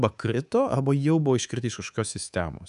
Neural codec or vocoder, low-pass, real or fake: codec, 24 kHz, 3.1 kbps, DualCodec; 10.8 kHz; fake